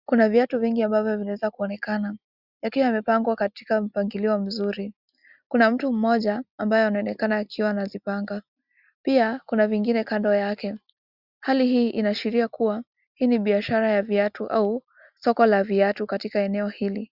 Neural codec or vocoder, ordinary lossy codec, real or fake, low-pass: none; Opus, 64 kbps; real; 5.4 kHz